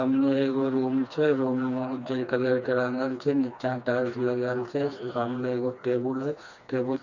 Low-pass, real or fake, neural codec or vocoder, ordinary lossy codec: 7.2 kHz; fake; codec, 16 kHz, 2 kbps, FreqCodec, smaller model; none